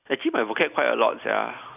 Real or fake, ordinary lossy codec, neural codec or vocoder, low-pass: real; none; none; 3.6 kHz